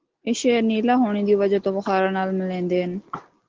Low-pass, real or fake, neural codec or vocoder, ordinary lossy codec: 7.2 kHz; real; none; Opus, 16 kbps